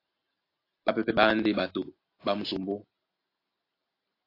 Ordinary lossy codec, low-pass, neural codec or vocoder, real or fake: AAC, 32 kbps; 5.4 kHz; none; real